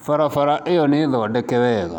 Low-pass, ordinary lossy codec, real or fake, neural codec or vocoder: 19.8 kHz; none; real; none